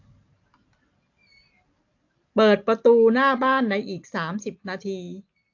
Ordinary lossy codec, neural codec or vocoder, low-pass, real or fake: AAC, 48 kbps; none; 7.2 kHz; real